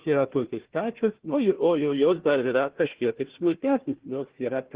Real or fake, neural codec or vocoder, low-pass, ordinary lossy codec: fake; codec, 16 kHz, 1 kbps, FunCodec, trained on Chinese and English, 50 frames a second; 3.6 kHz; Opus, 16 kbps